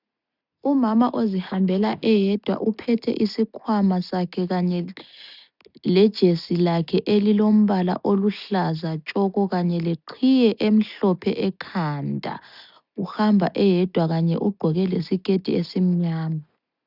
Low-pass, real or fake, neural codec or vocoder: 5.4 kHz; real; none